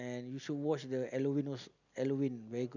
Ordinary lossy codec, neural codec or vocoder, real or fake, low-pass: none; none; real; 7.2 kHz